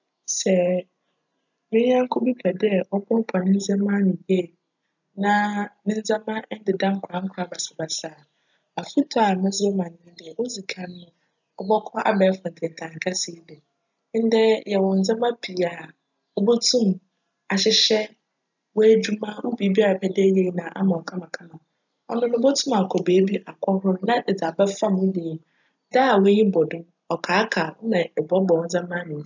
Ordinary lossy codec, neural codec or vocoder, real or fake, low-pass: none; none; real; 7.2 kHz